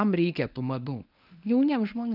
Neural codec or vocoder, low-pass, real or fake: codec, 24 kHz, 0.9 kbps, WavTokenizer, medium speech release version 1; 5.4 kHz; fake